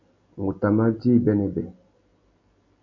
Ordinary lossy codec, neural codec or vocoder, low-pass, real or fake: MP3, 48 kbps; none; 7.2 kHz; real